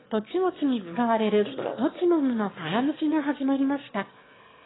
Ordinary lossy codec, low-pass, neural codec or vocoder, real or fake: AAC, 16 kbps; 7.2 kHz; autoencoder, 22.05 kHz, a latent of 192 numbers a frame, VITS, trained on one speaker; fake